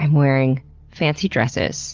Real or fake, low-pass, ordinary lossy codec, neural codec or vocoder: real; 7.2 kHz; Opus, 24 kbps; none